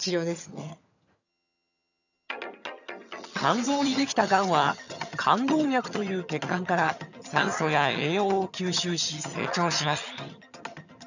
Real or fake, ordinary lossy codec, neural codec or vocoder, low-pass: fake; none; vocoder, 22.05 kHz, 80 mel bands, HiFi-GAN; 7.2 kHz